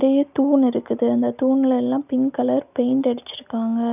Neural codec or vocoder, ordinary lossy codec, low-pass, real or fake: none; none; 3.6 kHz; real